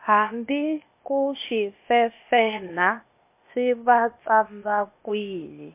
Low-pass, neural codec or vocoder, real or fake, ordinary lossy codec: 3.6 kHz; codec, 16 kHz, 0.8 kbps, ZipCodec; fake; MP3, 24 kbps